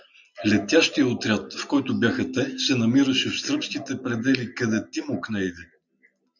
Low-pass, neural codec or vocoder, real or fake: 7.2 kHz; none; real